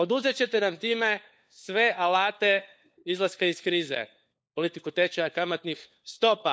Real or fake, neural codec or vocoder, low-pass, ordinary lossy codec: fake; codec, 16 kHz, 4 kbps, FunCodec, trained on LibriTTS, 50 frames a second; none; none